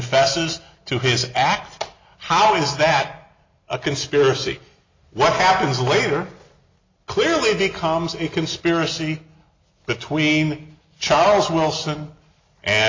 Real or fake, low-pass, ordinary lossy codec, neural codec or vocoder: real; 7.2 kHz; MP3, 48 kbps; none